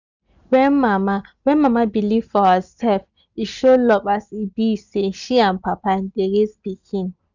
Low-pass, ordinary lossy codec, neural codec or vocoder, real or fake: 7.2 kHz; none; none; real